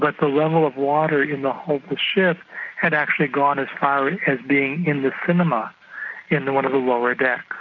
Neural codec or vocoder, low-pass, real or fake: none; 7.2 kHz; real